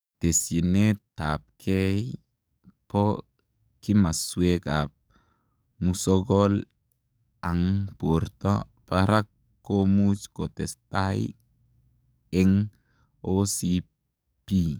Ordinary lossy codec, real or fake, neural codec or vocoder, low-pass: none; fake; codec, 44.1 kHz, 7.8 kbps, DAC; none